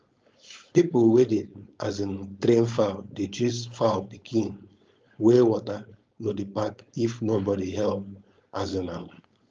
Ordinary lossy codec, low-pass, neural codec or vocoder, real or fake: Opus, 24 kbps; 7.2 kHz; codec, 16 kHz, 4.8 kbps, FACodec; fake